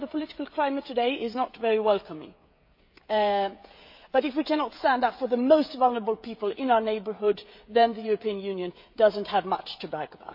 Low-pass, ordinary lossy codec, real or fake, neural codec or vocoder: 5.4 kHz; MP3, 32 kbps; fake; codec, 16 kHz, 16 kbps, FreqCodec, smaller model